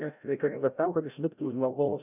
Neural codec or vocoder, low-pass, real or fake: codec, 16 kHz, 0.5 kbps, FreqCodec, larger model; 3.6 kHz; fake